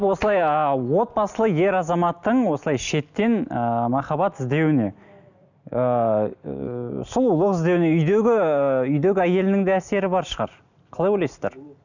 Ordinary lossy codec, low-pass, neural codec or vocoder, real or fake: none; 7.2 kHz; none; real